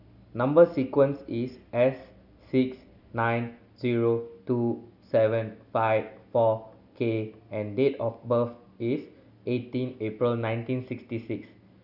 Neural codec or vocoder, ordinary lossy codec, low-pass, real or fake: none; none; 5.4 kHz; real